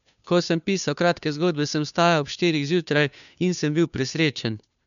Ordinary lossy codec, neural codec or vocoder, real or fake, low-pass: none; codec, 16 kHz, 2 kbps, FunCodec, trained on Chinese and English, 25 frames a second; fake; 7.2 kHz